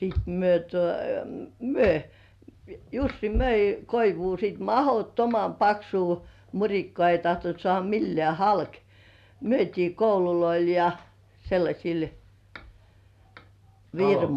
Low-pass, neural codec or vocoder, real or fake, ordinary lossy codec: 14.4 kHz; none; real; none